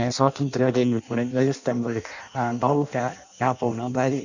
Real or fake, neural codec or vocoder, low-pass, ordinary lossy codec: fake; codec, 16 kHz in and 24 kHz out, 0.6 kbps, FireRedTTS-2 codec; 7.2 kHz; none